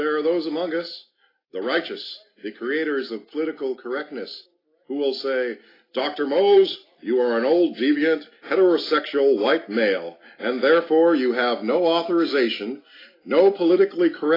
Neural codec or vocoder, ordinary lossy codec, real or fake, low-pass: none; AAC, 24 kbps; real; 5.4 kHz